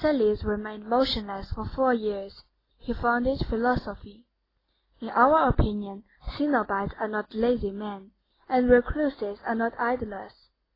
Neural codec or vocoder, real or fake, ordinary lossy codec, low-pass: none; real; AAC, 24 kbps; 5.4 kHz